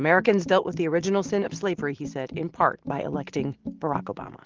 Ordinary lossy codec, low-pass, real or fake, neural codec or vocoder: Opus, 16 kbps; 7.2 kHz; real; none